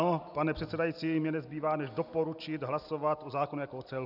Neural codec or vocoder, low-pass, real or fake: none; 5.4 kHz; real